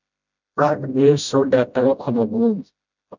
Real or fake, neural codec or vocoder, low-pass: fake; codec, 16 kHz, 0.5 kbps, FreqCodec, smaller model; 7.2 kHz